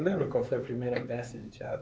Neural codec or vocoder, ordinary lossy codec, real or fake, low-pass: codec, 16 kHz, 4 kbps, X-Codec, HuBERT features, trained on LibriSpeech; none; fake; none